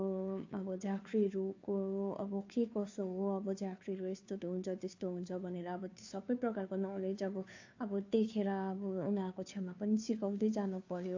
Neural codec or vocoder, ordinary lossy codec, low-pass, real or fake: codec, 16 kHz, 2 kbps, FunCodec, trained on Chinese and English, 25 frames a second; AAC, 48 kbps; 7.2 kHz; fake